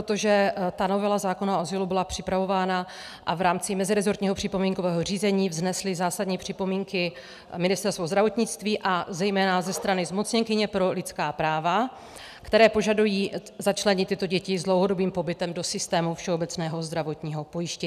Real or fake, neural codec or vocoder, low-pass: real; none; 14.4 kHz